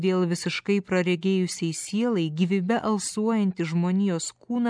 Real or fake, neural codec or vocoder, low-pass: real; none; 9.9 kHz